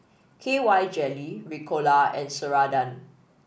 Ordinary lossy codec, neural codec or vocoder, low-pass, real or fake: none; none; none; real